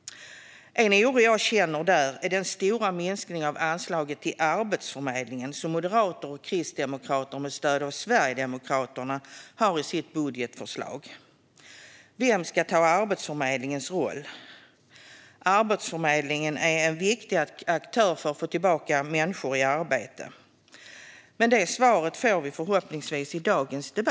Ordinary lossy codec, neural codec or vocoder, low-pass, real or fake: none; none; none; real